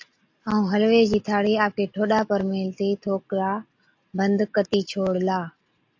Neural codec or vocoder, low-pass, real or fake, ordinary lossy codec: none; 7.2 kHz; real; AAC, 48 kbps